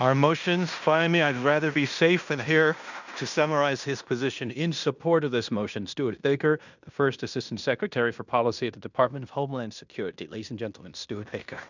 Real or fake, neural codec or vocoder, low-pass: fake; codec, 16 kHz in and 24 kHz out, 0.9 kbps, LongCat-Audio-Codec, fine tuned four codebook decoder; 7.2 kHz